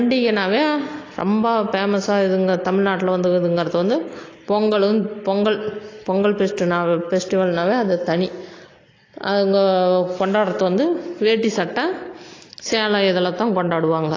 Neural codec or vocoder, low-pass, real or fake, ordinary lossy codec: none; 7.2 kHz; real; AAC, 32 kbps